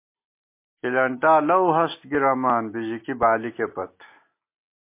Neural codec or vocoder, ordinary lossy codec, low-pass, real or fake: none; MP3, 24 kbps; 3.6 kHz; real